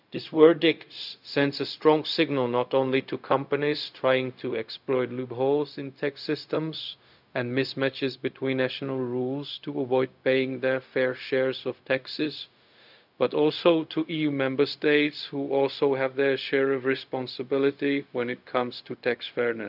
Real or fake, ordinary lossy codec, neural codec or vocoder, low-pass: fake; none; codec, 16 kHz, 0.4 kbps, LongCat-Audio-Codec; 5.4 kHz